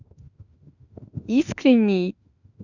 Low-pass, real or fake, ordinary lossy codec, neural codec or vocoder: 7.2 kHz; fake; none; autoencoder, 48 kHz, 32 numbers a frame, DAC-VAE, trained on Japanese speech